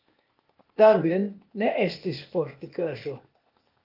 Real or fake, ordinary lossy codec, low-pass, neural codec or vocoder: fake; Opus, 24 kbps; 5.4 kHz; codec, 16 kHz, 0.8 kbps, ZipCodec